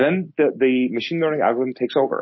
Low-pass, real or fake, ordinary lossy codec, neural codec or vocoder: 7.2 kHz; real; MP3, 24 kbps; none